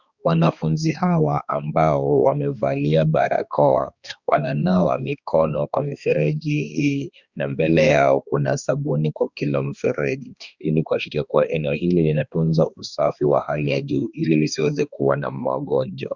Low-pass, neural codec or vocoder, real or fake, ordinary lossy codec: 7.2 kHz; codec, 16 kHz, 2 kbps, X-Codec, HuBERT features, trained on balanced general audio; fake; Opus, 64 kbps